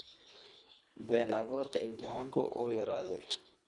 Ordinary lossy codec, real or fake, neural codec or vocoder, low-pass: none; fake; codec, 24 kHz, 1.5 kbps, HILCodec; 10.8 kHz